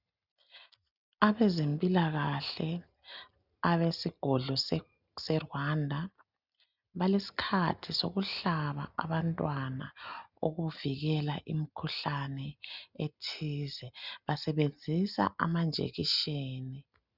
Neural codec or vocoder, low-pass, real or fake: none; 5.4 kHz; real